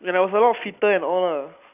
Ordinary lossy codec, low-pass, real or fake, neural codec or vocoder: none; 3.6 kHz; fake; vocoder, 44.1 kHz, 128 mel bands every 256 samples, BigVGAN v2